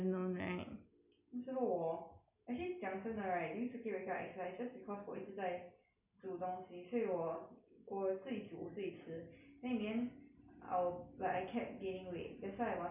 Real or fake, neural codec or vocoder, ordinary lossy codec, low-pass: real; none; none; 3.6 kHz